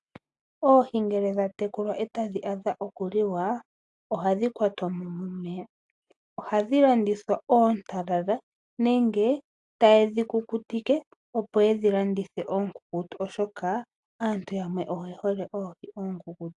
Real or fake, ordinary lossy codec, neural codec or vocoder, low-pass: real; AAC, 64 kbps; none; 10.8 kHz